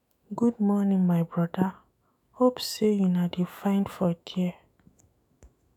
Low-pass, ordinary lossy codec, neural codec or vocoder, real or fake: 19.8 kHz; none; autoencoder, 48 kHz, 128 numbers a frame, DAC-VAE, trained on Japanese speech; fake